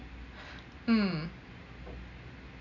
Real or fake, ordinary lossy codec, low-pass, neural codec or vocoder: real; none; 7.2 kHz; none